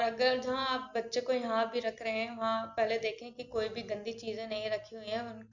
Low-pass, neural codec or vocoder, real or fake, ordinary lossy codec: 7.2 kHz; none; real; none